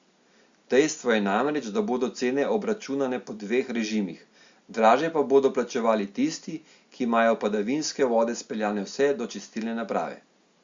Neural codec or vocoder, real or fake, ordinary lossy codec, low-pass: none; real; Opus, 64 kbps; 7.2 kHz